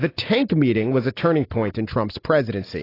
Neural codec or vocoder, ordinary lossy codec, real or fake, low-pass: none; AAC, 24 kbps; real; 5.4 kHz